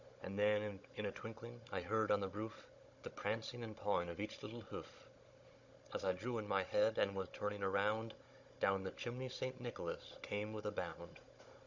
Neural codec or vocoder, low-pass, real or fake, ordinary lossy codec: codec, 16 kHz, 16 kbps, FunCodec, trained on Chinese and English, 50 frames a second; 7.2 kHz; fake; Opus, 64 kbps